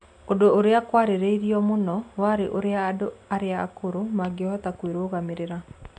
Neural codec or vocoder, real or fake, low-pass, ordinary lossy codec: none; real; 9.9 kHz; none